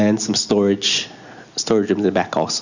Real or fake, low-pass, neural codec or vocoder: real; 7.2 kHz; none